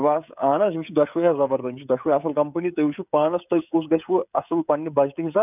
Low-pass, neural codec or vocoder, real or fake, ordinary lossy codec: 3.6 kHz; codec, 16 kHz, 16 kbps, FreqCodec, smaller model; fake; none